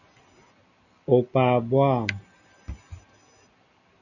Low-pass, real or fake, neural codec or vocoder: 7.2 kHz; real; none